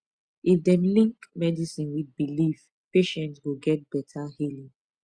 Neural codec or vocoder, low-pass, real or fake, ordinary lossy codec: none; 9.9 kHz; real; Opus, 64 kbps